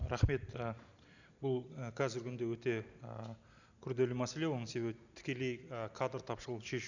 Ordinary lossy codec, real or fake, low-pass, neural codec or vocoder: none; real; 7.2 kHz; none